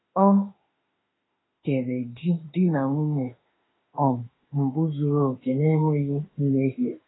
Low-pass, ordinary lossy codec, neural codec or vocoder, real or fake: 7.2 kHz; AAC, 16 kbps; autoencoder, 48 kHz, 32 numbers a frame, DAC-VAE, trained on Japanese speech; fake